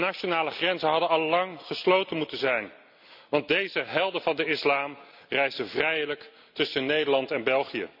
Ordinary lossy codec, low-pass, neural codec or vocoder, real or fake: none; 5.4 kHz; none; real